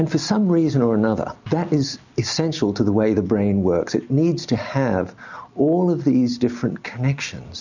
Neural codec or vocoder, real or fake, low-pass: none; real; 7.2 kHz